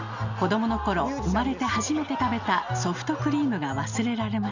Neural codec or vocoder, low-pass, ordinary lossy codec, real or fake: none; 7.2 kHz; Opus, 64 kbps; real